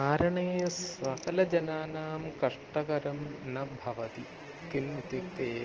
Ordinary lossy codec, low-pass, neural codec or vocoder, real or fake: Opus, 16 kbps; 7.2 kHz; none; real